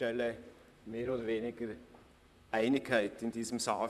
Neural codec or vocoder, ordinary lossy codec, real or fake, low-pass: vocoder, 44.1 kHz, 128 mel bands, Pupu-Vocoder; none; fake; 14.4 kHz